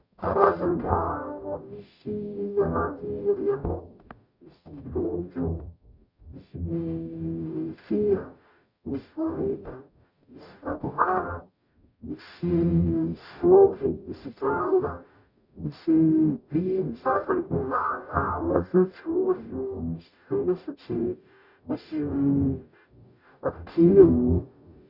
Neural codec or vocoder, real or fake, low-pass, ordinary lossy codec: codec, 44.1 kHz, 0.9 kbps, DAC; fake; 5.4 kHz; none